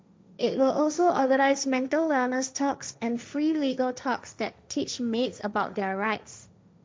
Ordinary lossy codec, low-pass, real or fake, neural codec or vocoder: none; none; fake; codec, 16 kHz, 1.1 kbps, Voila-Tokenizer